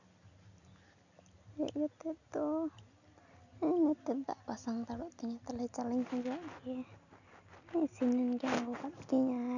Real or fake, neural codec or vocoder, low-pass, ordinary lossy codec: real; none; 7.2 kHz; none